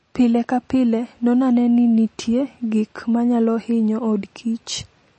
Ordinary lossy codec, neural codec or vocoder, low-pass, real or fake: MP3, 32 kbps; none; 9.9 kHz; real